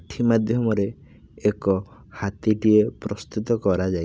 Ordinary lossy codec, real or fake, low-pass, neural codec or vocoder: none; real; none; none